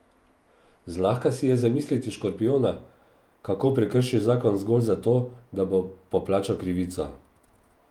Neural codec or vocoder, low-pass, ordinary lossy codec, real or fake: vocoder, 48 kHz, 128 mel bands, Vocos; 19.8 kHz; Opus, 32 kbps; fake